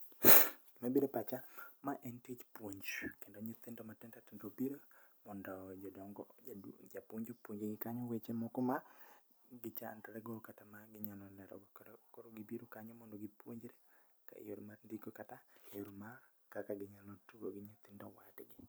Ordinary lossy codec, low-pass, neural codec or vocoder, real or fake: none; none; none; real